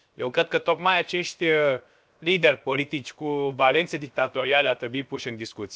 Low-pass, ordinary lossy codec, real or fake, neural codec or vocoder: none; none; fake; codec, 16 kHz, 0.7 kbps, FocalCodec